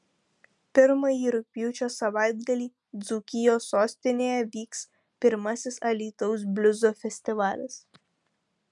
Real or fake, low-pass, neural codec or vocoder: real; 10.8 kHz; none